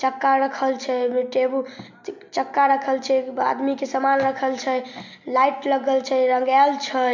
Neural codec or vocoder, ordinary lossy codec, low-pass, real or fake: none; MP3, 48 kbps; 7.2 kHz; real